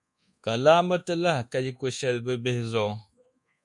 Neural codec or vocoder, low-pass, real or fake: codec, 24 kHz, 1.2 kbps, DualCodec; 10.8 kHz; fake